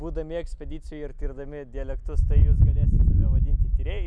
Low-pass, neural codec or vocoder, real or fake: 9.9 kHz; none; real